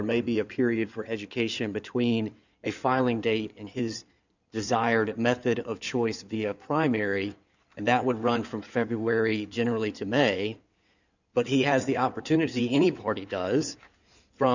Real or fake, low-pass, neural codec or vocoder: fake; 7.2 kHz; codec, 16 kHz in and 24 kHz out, 2.2 kbps, FireRedTTS-2 codec